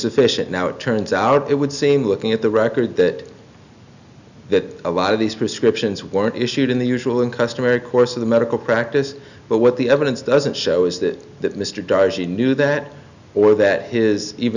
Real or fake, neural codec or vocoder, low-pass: real; none; 7.2 kHz